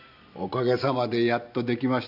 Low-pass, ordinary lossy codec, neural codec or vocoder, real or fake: 5.4 kHz; none; none; real